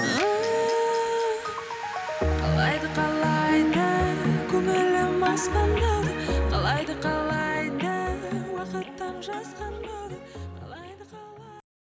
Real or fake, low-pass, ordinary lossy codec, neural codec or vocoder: real; none; none; none